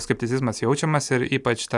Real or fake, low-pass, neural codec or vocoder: real; 10.8 kHz; none